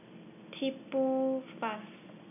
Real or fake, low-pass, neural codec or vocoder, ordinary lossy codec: real; 3.6 kHz; none; none